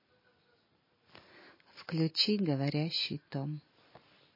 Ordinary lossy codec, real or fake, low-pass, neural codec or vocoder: MP3, 24 kbps; real; 5.4 kHz; none